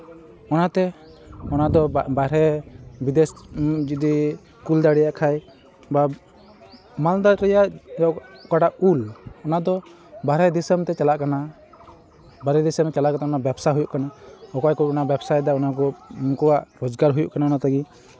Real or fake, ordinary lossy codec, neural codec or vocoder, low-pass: real; none; none; none